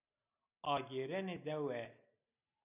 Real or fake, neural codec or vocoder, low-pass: real; none; 3.6 kHz